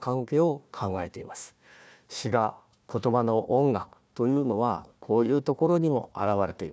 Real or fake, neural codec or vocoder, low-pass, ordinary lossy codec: fake; codec, 16 kHz, 1 kbps, FunCodec, trained on Chinese and English, 50 frames a second; none; none